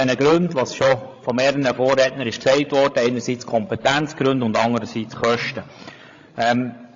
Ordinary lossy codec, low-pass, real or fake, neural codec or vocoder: AAC, 48 kbps; 7.2 kHz; fake; codec, 16 kHz, 16 kbps, FreqCodec, larger model